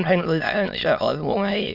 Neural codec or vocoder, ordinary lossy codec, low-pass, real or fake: autoencoder, 22.05 kHz, a latent of 192 numbers a frame, VITS, trained on many speakers; none; 5.4 kHz; fake